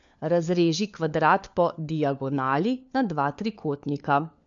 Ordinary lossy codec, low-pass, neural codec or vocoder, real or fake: MP3, 64 kbps; 7.2 kHz; codec, 16 kHz, 4 kbps, FunCodec, trained on LibriTTS, 50 frames a second; fake